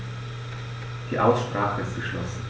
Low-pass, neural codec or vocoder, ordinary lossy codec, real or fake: none; none; none; real